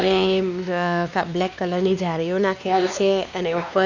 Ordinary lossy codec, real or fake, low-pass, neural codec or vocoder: none; fake; 7.2 kHz; codec, 16 kHz, 2 kbps, X-Codec, WavLM features, trained on Multilingual LibriSpeech